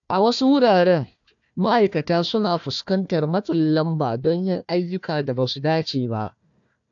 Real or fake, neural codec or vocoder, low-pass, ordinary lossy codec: fake; codec, 16 kHz, 1 kbps, FunCodec, trained on Chinese and English, 50 frames a second; 7.2 kHz; AAC, 64 kbps